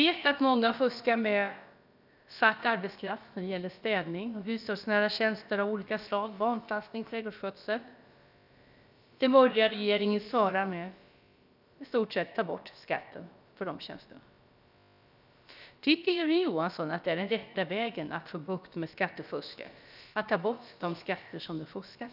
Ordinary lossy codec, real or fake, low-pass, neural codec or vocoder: none; fake; 5.4 kHz; codec, 16 kHz, about 1 kbps, DyCAST, with the encoder's durations